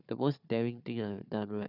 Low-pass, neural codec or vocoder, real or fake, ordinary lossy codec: 5.4 kHz; codec, 16 kHz, 4 kbps, FunCodec, trained on Chinese and English, 50 frames a second; fake; none